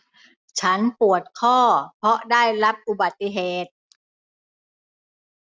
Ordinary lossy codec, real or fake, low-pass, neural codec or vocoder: none; real; none; none